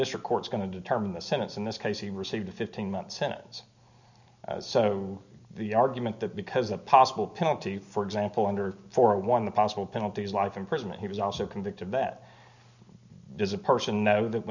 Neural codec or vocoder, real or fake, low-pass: none; real; 7.2 kHz